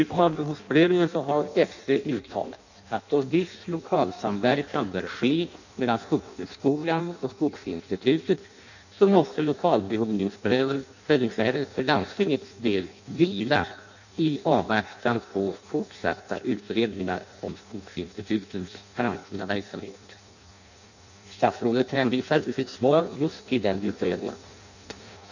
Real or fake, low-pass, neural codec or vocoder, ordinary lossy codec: fake; 7.2 kHz; codec, 16 kHz in and 24 kHz out, 0.6 kbps, FireRedTTS-2 codec; none